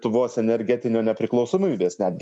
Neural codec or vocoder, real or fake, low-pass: none; real; 10.8 kHz